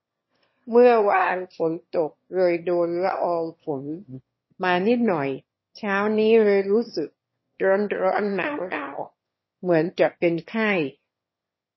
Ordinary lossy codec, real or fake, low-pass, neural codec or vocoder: MP3, 24 kbps; fake; 7.2 kHz; autoencoder, 22.05 kHz, a latent of 192 numbers a frame, VITS, trained on one speaker